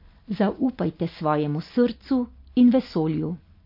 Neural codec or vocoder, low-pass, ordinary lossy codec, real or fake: none; 5.4 kHz; MP3, 32 kbps; real